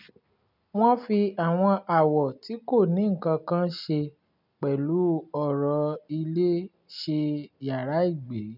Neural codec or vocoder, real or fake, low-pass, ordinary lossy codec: none; real; 5.4 kHz; none